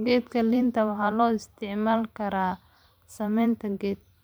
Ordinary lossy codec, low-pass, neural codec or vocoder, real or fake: none; none; vocoder, 44.1 kHz, 128 mel bands every 512 samples, BigVGAN v2; fake